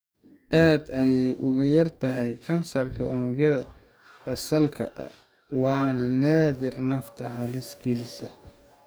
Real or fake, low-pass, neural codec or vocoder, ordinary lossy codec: fake; none; codec, 44.1 kHz, 2.6 kbps, DAC; none